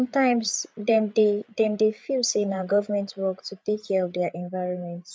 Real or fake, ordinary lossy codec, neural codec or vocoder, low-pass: fake; none; codec, 16 kHz, 8 kbps, FreqCodec, larger model; none